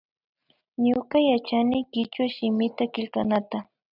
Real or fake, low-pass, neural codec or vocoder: real; 5.4 kHz; none